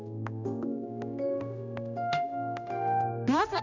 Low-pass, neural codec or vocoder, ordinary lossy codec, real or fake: 7.2 kHz; codec, 16 kHz, 1 kbps, X-Codec, HuBERT features, trained on general audio; none; fake